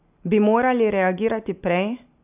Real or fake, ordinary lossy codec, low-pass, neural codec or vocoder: real; none; 3.6 kHz; none